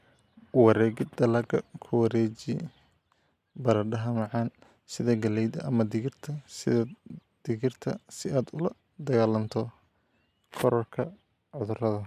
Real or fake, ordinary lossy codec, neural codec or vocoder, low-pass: real; MP3, 96 kbps; none; 14.4 kHz